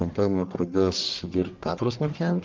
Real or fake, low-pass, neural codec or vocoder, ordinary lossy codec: fake; 7.2 kHz; codec, 44.1 kHz, 1.7 kbps, Pupu-Codec; Opus, 24 kbps